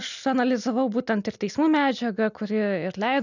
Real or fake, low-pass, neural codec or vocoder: real; 7.2 kHz; none